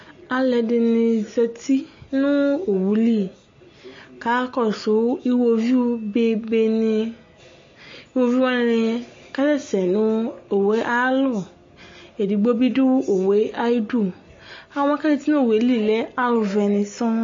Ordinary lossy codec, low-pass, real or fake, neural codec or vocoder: MP3, 32 kbps; 7.2 kHz; real; none